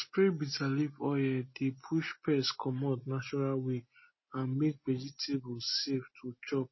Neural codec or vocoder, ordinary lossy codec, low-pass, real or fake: none; MP3, 24 kbps; 7.2 kHz; real